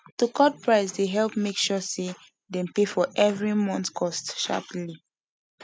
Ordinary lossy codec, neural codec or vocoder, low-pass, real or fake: none; none; none; real